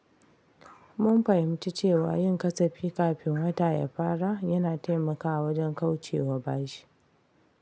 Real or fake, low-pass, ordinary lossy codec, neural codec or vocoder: real; none; none; none